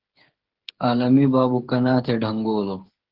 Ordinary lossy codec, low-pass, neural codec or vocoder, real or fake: Opus, 16 kbps; 5.4 kHz; codec, 16 kHz, 8 kbps, FreqCodec, smaller model; fake